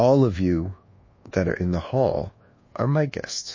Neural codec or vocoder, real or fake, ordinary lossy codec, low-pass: codec, 24 kHz, 1.2 kbps, DualCodec; fake; MP3, 32 kbps; 7.2 kHz